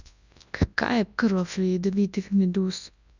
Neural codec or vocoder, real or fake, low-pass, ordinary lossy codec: codec, 24 kHz, 0.9 kbps, WavTokenizer, large speech release; fake; 7.2 kHz; none